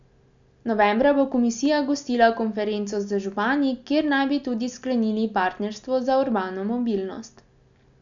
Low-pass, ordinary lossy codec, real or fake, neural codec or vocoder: 7.2 kHz; none; real; none